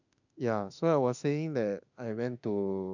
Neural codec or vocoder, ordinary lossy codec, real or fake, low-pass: autoencoder, 48 kHz, 32 numbers a frame, DAC-VAE, trained on Japanese speech; none; fake; 7.2 kHz